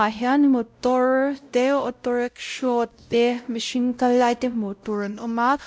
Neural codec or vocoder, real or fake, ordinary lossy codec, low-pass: codec, 16 kHz, 0.5 kbps, X-Codec, WavLM features, trained on Multilingual LibriSpeech; fake; none; none